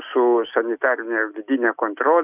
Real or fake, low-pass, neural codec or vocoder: real; 3.6 kHz; none